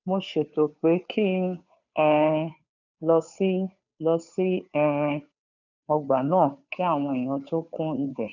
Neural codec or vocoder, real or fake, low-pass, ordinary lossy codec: codec, 16 kHz, 2 kbps, FunCodec, trained on Chinese and English, 25 frames a second; fake; 7.2 kHz; none